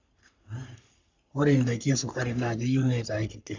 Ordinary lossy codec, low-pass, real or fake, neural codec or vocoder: MP3, 64 kbps; 7.2 kHz; fake; codec, 44.1 kHz, 3.4 kbps, Pupu-Codec